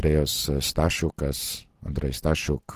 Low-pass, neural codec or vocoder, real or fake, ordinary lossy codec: 14.4 kHz; none; real; Opus, 16 kbps